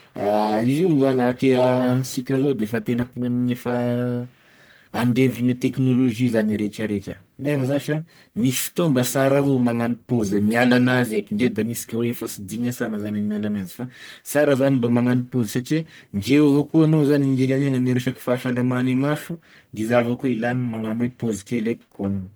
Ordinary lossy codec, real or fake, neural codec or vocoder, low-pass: none; fake; codec, 44.1 kHz, 1.7 kbps, Pupu-Codec; none